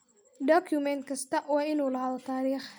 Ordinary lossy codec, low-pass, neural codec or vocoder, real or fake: none; none; none; real